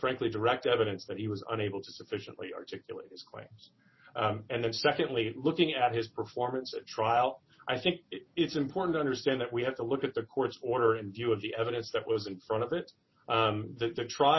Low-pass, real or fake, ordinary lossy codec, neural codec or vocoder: 7.2 kHz; real; MP3, 24 kbps; none